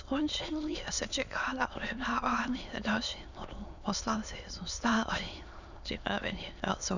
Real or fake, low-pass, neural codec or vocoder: fake; 7.2 kHz; autoencoder, 22.05 kHz, a latent of 192 numbers a frame, VITS, trained on many speakers